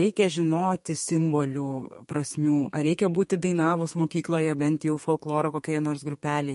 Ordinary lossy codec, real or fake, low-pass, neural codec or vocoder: MP3, 48 kbps; fake; 14.4 kHz; codec, 32 kHz, 1.9 kbps, SNAC